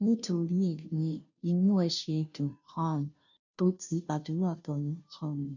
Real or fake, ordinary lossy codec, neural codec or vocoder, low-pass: fake; none; codec, 16 kHz, 0.5 kbps, FunCodec, trained on Chinese and English, 25 frames a second; 7.2 kHz